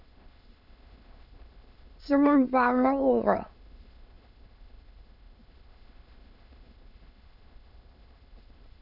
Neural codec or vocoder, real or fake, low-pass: autoencoder, 22.05 kHz, a latent of 192 numbers a frame, VITS, trained on many speakers; fake; 5.4 kHz